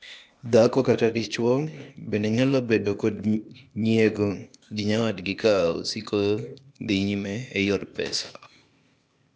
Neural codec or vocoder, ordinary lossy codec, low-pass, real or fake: codec, 16 kHz, 0.8 kbps, ZipCodec; none; none; fake